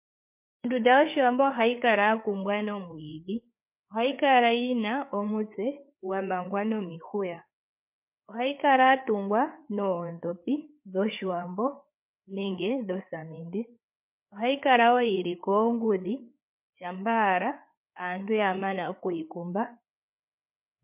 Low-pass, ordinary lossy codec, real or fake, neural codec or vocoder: 3.6 kHz; MP3, 32 kbps; fake; codec, 16 kHz, 4 kbps, FreqCodec, larger model